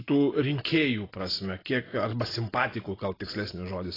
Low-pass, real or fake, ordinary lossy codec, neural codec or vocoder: 5.4 kHz; real; AAC, 24 kbps; none